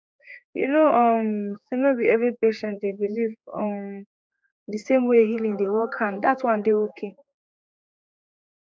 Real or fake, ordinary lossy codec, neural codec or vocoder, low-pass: fake; none; codec, 16 kHz, 4 kbps, X-Codec, HuBERT features, trained on general audio; none